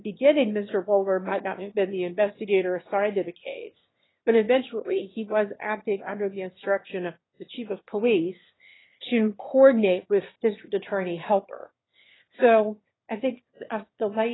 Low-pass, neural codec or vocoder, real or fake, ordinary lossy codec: 7.2 kHz; autoencoder, 22.05 kHz, a latent of 192 numbers a frame, VITS, trained on one speaker; fake; AAC, 16 kbps